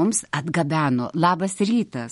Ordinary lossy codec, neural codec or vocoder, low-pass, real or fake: MP3, 48 kbps; none; 19.8 kHz; real